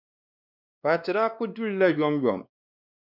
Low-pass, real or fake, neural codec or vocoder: 5.4 kHz; fake; codec, 16 kHz, 2 kbps, X-Codec, WavLM features, trained on Multilingual LibriSpeech